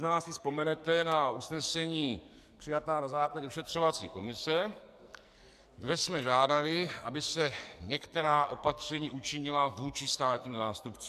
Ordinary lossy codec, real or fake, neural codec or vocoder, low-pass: MP3, 96 kbps; fake; codec, 44.1 kHz, 2.6 kbps, SNAC; 14.4 kHz